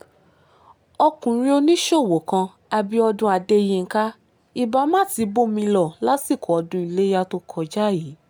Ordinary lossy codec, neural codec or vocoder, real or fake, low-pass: none; none; real; none